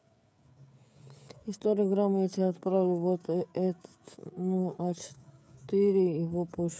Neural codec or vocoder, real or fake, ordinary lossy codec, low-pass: codec, 16 kHz, 16 kbps, FreqCodec, smaller model; fake; none; none